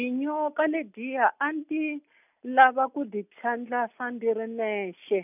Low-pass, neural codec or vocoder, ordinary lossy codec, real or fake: 3.6 kHz; none; none; real